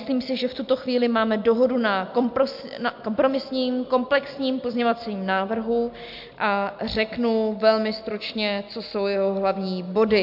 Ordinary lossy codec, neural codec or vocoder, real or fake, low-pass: MP3, 48 kbps; none; real; 5.4 kHz